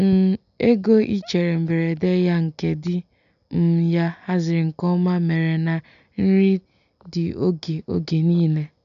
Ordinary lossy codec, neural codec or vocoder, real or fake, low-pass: none; none; real; 7.2 kHz